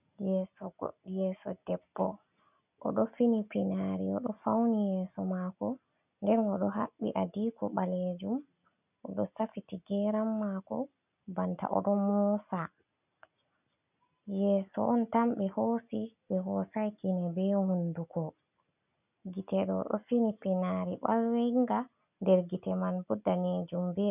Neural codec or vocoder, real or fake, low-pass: none; real; 3.6 kHz